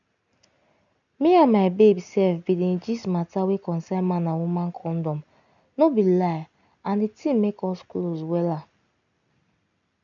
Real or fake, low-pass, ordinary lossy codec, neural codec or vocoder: real; 7.2 kHz; none; none